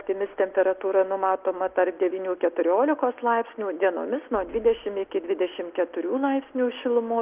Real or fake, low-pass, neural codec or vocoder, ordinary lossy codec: real; 3.6 kHz; none; Opus, 24 kbps